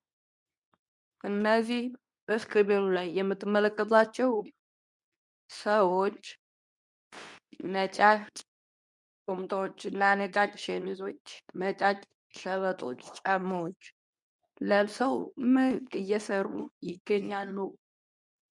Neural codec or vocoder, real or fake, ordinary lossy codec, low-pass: codec, 24 kHz, 0.9 kbps, WavTokenizer, medium speech release version 2; fake; MP3, 96 kbps; 10.8 kHz